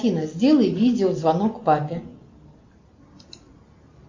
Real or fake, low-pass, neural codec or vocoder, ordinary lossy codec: real; 7.2 kHz; none; MP3, 48 kbps